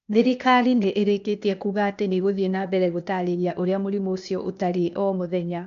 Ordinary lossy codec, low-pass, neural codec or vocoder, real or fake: none; 7.2 kHz; codec, 16 kHz, 0.8 kbps, ZipCodec; fake